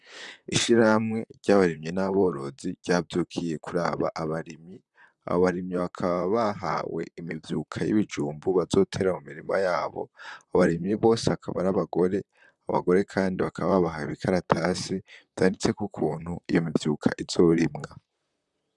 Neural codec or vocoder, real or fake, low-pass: vocoder, 44.1 kHz, 128 mel bands, Pupu-Vocoder; fake; 10.8 kHz